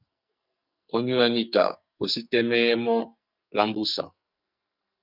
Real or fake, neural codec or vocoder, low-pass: fake; codec, 44.1 kHz, 2.6 kbps, SNAC; 5.4 kHz